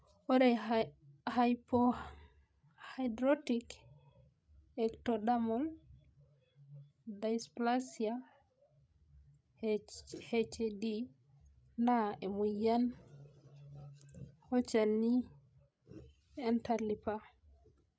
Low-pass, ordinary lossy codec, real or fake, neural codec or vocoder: none; none; fake; codec, 16 kHz, 16 kbps, FreqCodec, larger model